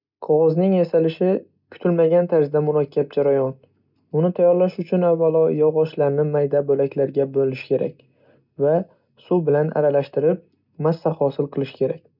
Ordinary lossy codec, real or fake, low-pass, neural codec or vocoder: none; real; 5.4 kHz; none